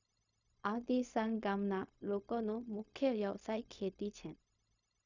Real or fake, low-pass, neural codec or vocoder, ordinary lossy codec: fake; 7.2 kHz; codec, 16 kHz, 0.4 kbps, LongCat-Audio-Codec; none